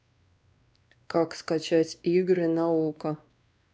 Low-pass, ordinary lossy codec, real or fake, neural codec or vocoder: none; none; fake; codec, 16 kHz, 2 kbps, X-Codec, WavLM features, trained on Multilingual LibriSpeech